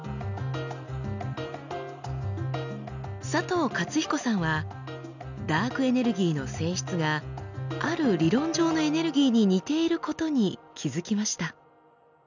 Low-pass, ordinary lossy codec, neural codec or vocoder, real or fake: 7.2 kHz; none; none; real